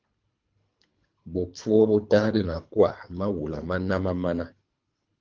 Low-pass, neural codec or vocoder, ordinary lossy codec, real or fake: 7.2 kHz; codec, 24 kHz, 3 kbps, HILCodec; Opus, 32 kbps; fake